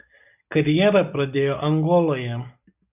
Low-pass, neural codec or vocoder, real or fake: 3.6 kHz; none; real